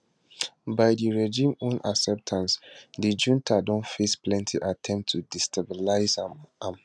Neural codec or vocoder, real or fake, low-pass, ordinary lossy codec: none; real; none; none